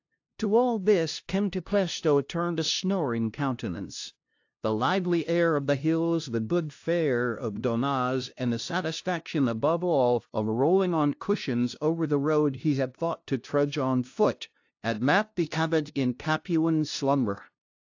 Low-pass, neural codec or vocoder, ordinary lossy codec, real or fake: 7.2 kHz; codec, 16 kHz, 0.5 kbps, FunCodec, trained on LibriTTS, 25 frames a second; AAC, 48 kbps; fake